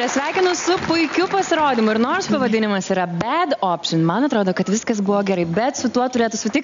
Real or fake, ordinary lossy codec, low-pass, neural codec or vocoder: real; MP3, 64 kbps; 7.2 kHz; none